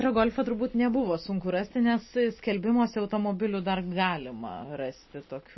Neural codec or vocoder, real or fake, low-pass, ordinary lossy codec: none; real; 7.2 kHz; MP3, 24 kbps